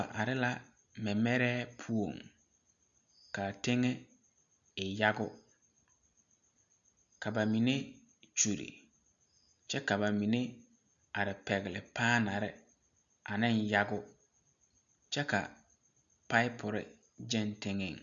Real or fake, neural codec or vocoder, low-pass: real; none; 7.2 kHz